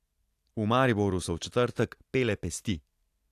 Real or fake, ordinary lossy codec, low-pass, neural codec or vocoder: real; AAC, 64 kbps; 14.4 kHz; none